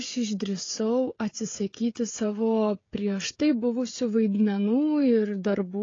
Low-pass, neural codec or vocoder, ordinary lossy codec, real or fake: 7.2 kHz; codec, 16 kHz, 16 kbps, FreqCodec, smaller model; AAC, 32 kbps; fake